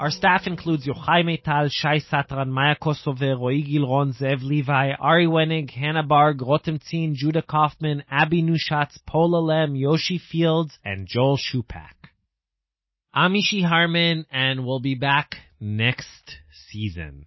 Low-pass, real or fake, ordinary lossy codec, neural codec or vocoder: 7.2 kHz; real; MP3, 24 kbps; none